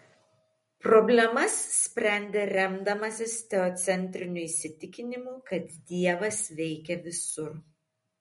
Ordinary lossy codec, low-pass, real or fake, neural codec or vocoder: MP3, 48 kbps; 19.8 kHz; real; none